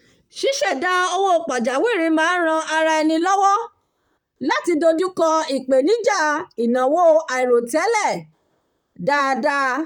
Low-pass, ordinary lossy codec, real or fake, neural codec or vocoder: 19.8 kHz; none; fake; vocoder, 44.1 kHz, 128 mel bands, Pupu-Vocoder